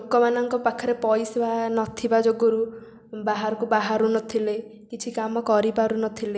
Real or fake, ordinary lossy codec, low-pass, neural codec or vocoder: real; none; none; none